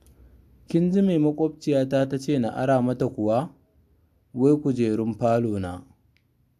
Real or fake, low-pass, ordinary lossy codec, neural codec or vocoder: real; 14.4 kHz; AAC, 96 kbps; none